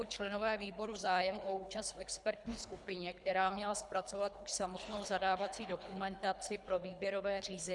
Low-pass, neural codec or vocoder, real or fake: 10.8 kHz; codec, 24 kHz, 3 kbps, HILCodec; fake